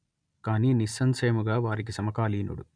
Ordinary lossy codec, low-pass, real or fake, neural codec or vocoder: none; 9.9 kHz; real; none